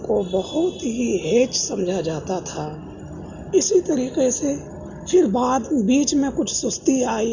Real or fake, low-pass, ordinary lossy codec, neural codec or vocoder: real; 7.2 kHz; Opus, 64 kbps; none